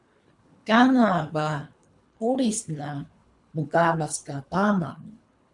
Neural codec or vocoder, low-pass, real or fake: codec, 24 kHz, 3 kbps, HILCodec; 10.8 kHz; fake